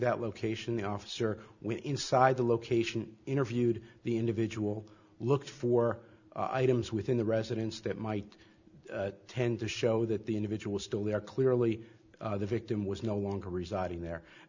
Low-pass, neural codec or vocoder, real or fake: 7.2 kHz; none; real